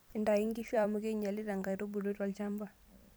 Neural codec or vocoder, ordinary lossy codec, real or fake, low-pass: vocoder, 44.1 kHz, 128 mel bands every 256 samples, BigVGAN v2; none; fake; none